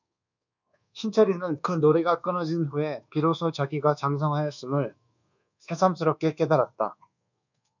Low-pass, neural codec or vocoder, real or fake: 7.2 kHz; codec, 24 kHz, 1.2 kbps, DualCodec; fake